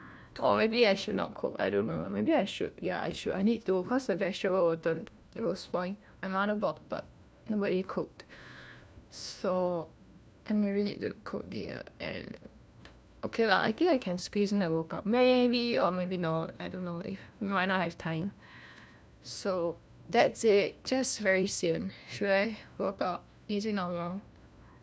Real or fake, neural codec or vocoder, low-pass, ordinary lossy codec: fake; codec, 16 kHz, 1 kbps, FunCodec, trained on LibriTTS, 50 frames a second; none; none